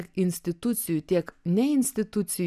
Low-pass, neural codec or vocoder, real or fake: 14.4 kHz; none; real